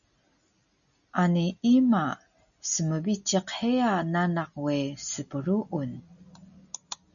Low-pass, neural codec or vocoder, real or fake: 7.2 kHz; none; real